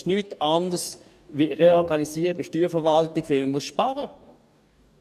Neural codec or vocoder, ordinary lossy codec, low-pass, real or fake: codec, 44.1 kHz, 2.6 kbps, DAC; none; 14.4 kHz; fake